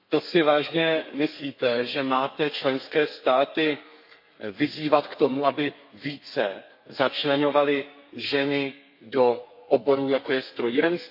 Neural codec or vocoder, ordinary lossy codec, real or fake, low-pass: codec, 32 kHz, 1.9 kbps, SNAC; MP3, 32 kbps; fake; 5.4 kHz